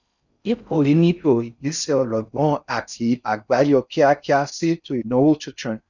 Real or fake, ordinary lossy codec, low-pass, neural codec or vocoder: fake; none; 7.2 kHz; codec, 16 kHz in and 24 kHz out, 0.6 kbps, FocalCodec, streaming, 4096 codes